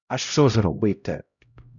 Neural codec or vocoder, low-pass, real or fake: codec, 16 kHz, 0.5 kbps, X-Codec, HuBERT features, trained on LibriSpeech; 7.2 kHz; fake